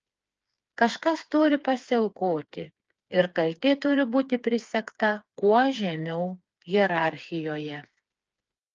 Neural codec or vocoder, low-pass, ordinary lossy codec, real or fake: codec, 16 kHz, 4 kbps, FreqCodec, smaller model; 7.2 kHz; Opus, 24 kbps; fake